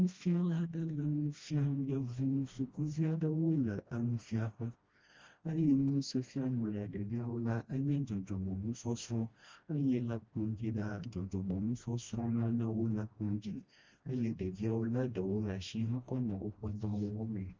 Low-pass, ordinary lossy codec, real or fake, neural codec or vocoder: 7.2 kHz; Opus, 32 kbps; fake; codec, 16 kHz, 1 kbps, FreqCodec, smaller model